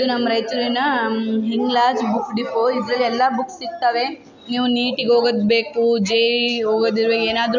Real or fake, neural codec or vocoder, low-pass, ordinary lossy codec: real; none; 7.2 kHz; none